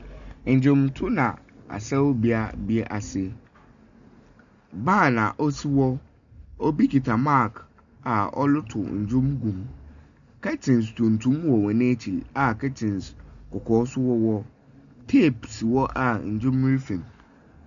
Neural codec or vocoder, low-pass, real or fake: none; 7.2 kHz; real